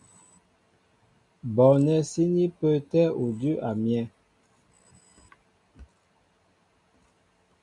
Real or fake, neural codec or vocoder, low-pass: real; none; 10.8 kHz